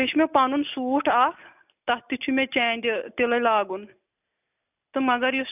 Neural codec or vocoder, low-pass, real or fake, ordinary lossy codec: none; 3.6 kHz; real; none